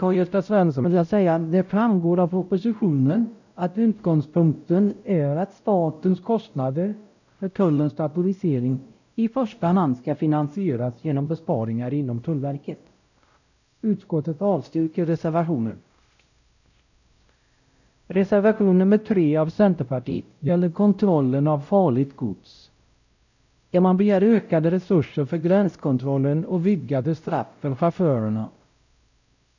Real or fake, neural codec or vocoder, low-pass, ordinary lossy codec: fake; codec, 16 kHz, 0.5 kbps, X-Codec, WavLM features, trained on Multilingual LibriSpeech; 7.2 kHz; none